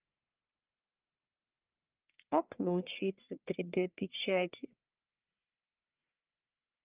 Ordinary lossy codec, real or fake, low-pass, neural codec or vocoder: Opus, 24 kbps; fake; 3.6 kHz; codec, 44.1 kHz, 1.7 kbps, Pupu-Codec